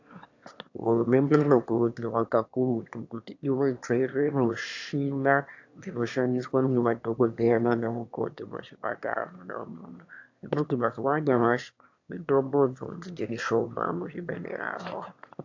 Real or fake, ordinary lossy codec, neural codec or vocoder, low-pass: fake; AAC, 48 kbps; autoencoder, 22.05 kHz, a latent of 192 numbers a frame, VITS, trained on one speaker; 7.2 kHz